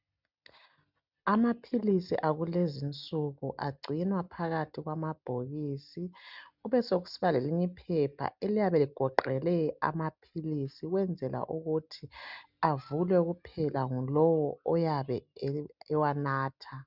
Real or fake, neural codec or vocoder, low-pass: real; none; 5.4 kHz